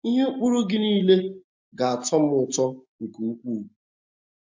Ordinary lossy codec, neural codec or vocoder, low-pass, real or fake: MP3, 48 kbps; none; 7.2 kHz; real